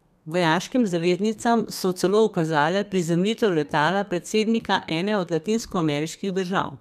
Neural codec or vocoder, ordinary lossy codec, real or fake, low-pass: codec, 32 kHz, 1.9 kbps, SNAC; none; fake; 14.4 kHz